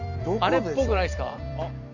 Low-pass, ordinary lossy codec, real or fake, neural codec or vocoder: 7.2 kHz; none; fake; vocoder, 44.1 kHz, 128 mel bands every 256 samples, BigVGAN v2